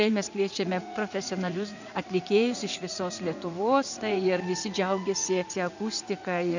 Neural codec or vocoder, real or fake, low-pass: vocoder, 44.1 kHz, 80 mel bands, Vocos; fake; 7.2 kHz